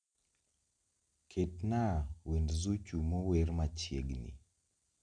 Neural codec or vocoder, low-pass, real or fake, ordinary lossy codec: none; 9.9 kHz; real; none